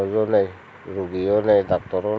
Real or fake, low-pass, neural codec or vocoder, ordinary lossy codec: real; none; none; none